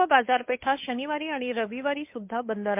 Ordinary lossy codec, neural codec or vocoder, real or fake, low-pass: MP3, 32 kbps; codec, 16 kHz, 8 kbps, FunCodec, trained on Chinese and English, 25 frames a second; fake; 3.6 kHz